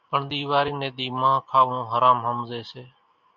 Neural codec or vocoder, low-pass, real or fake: none; 7.2 kHz; real